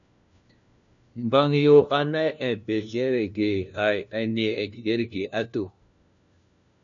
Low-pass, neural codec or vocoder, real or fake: 7.2 kHz; codec, 16 kHz, 1 kbps, FunCodec, trained on LibriTTS, 50 frames a second; fake